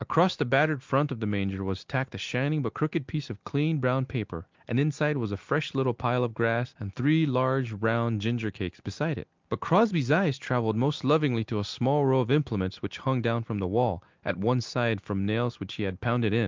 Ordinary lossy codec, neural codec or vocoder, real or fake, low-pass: Opus, 32 kbps; none; real; 7.2 kHz